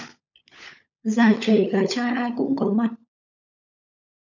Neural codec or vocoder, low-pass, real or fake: codec, 16 kHz, 16 kbps, FunCodec, trained on LibriTTS, 50 frames a second; 7.2 kHz; fake